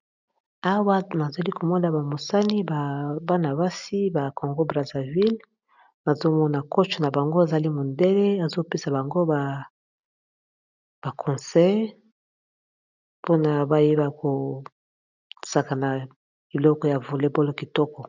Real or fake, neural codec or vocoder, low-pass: real; none; 7.2 kHz